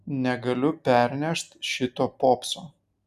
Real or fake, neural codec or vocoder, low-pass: real; none; 14.4 kHz